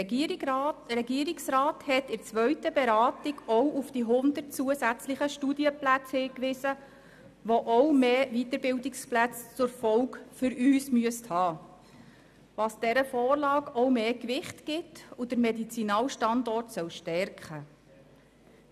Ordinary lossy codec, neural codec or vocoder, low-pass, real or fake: none; none; 14.4 kHz; real